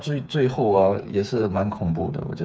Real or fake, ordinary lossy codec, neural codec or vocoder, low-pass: fake; none; codec, 16 kHz, 4 kbps, FreqCodec, smaller model; none